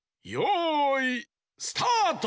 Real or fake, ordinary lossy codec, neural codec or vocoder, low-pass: real; none; none; none